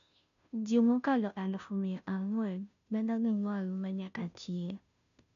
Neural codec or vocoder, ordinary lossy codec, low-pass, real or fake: codec, 16 kHz, 0.5 kbps, FunCodec, trained on Chinese and English, 25 frames a second; none; 7.2 kHz; fake